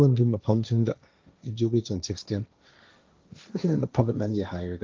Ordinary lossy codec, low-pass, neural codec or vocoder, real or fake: Opus, 24 kbps; 7.2 kHz; codec, 16 kHz, 1.1 kbps, Voila-Tokenizer; fake